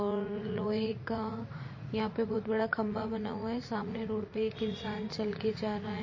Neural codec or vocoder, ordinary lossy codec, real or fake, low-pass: vocoder, 44.1 kHz, 80 mel bands, Vocos; MP3, 32 kbps; fake; 7.2 kHz